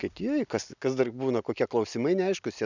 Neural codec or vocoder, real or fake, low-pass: none; real; 7.2 kHz